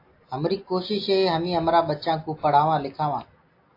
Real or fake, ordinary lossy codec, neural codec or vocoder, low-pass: real; AAC, 32 kbps; none; 5.4 kHz